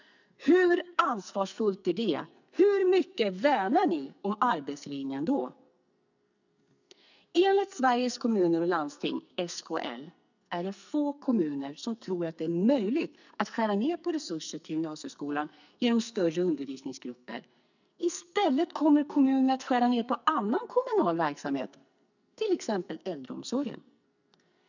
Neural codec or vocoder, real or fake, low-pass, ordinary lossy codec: codec, 44.1 kHz, 2.6 kbps, SNAC; fake; 7.2 kHz; none